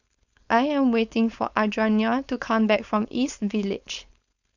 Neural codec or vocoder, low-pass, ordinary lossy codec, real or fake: codec, 16 kHz, 4.8 kbps, FACodec; 7.2 kHz; none; fake